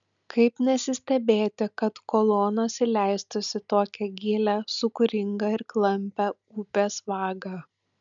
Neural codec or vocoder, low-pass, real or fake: none; 7.2 kHz; real